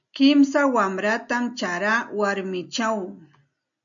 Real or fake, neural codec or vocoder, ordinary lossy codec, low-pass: real; none; MP3, 64 kbps; 7.2 kHz